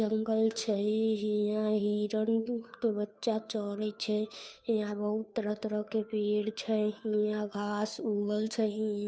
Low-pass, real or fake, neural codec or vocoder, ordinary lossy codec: none; fake; codec, 16 kHz, 2 kbps, FunCodec, trained on Chinese and English, 25 frames a second; none